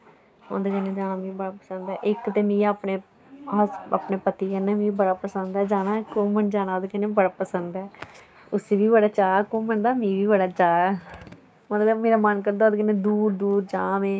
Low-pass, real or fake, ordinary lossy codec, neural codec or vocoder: none; fake; none; codec, 16 kHz, 6 kbps, DAC